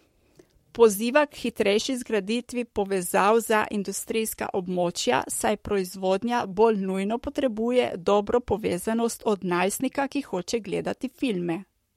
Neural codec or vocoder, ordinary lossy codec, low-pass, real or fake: codec, 44.1 kHz, 7.8 kbps, Pupu-Codec; MP3, 64 kbps; 19.8 kHz; fake